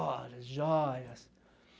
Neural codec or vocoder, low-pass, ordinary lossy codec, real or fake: none; none; none; real